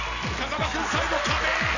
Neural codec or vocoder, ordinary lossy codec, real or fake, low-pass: none; none; real; 7.2 kHz